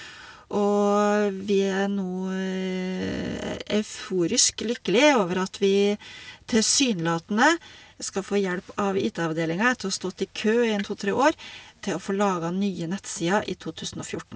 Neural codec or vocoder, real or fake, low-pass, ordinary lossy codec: none; real; none; none